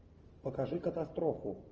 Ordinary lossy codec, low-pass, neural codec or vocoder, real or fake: Opus, 24 kbps; 7.2 kHz; none; real